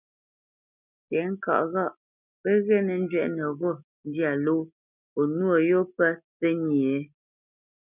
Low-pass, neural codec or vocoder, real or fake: 3.6 kHz; none; real